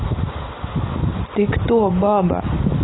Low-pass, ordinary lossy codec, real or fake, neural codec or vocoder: 7.2 kHz; AAC, 16 kbps; real; none